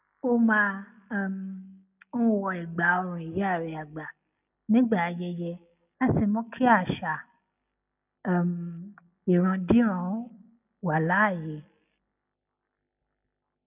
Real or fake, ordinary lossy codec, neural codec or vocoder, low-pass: real; none; none; 3.6 kHz